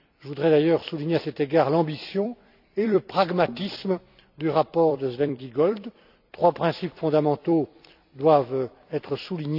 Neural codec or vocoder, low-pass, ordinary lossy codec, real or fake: vocoder, 44.1 kHz, 128 mel bands every 256 samples, BigVGAN v2; 5.4 kHz; none; fake